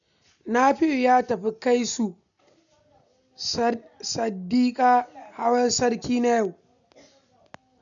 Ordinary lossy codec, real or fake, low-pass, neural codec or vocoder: none; real; 7.2 kHz; none